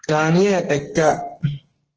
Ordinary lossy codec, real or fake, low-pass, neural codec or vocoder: Opus, 16 kbps; fake; 7.2 kHz; codec, 32 kHz, 1.9 kbps, SNAC